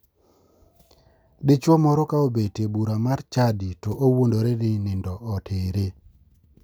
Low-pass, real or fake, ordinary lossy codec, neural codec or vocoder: none; real; none; none